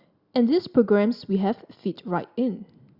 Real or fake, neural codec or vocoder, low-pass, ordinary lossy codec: real; none; 5.4 kHz; Opus, 64 kbps